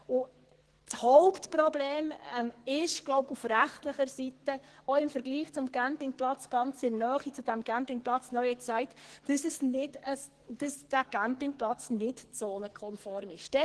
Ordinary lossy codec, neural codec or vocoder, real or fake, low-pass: Opus, 16 kbps; codec, 44.1 kHz, 2.6 kbps, SNAC; fake; 10.8 kHz